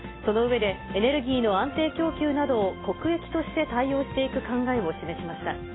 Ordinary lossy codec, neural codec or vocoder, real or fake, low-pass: AAC, 16 kbps; none; real; 7.2 kHz